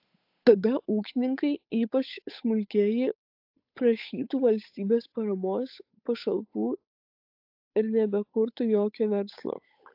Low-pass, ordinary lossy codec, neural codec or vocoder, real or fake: 5.4 kHz; AAC, 48 kbps; codec, 16 kHz, 8 kbps, FunCodec, trained on Chinese and English, 25 frames a second; fake